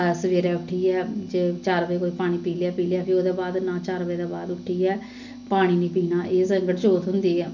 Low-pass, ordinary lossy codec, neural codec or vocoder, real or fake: 7.2 kHz; none; none; real